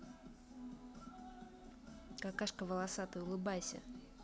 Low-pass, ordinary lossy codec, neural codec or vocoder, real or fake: none; none; none; real